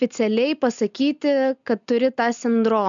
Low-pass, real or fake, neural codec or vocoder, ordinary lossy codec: 7.2 kHz; real; none; MP3, 96 kbps